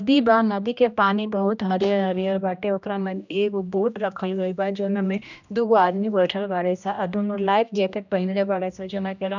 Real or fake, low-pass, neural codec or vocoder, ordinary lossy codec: fake; 7.2 kHz; codec, 16 kHz, 1 kbps, X-Codec, HuBERT features, trained on general audio; none